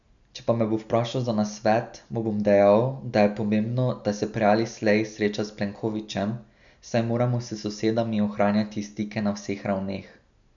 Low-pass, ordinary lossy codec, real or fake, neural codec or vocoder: 7.2 kHz; none; real; none